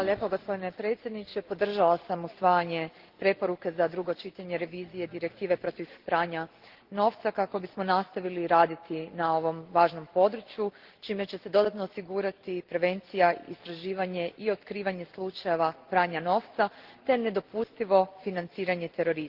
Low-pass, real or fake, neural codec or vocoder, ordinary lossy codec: 5.4 kHz; real; none; Opus, 16 kbps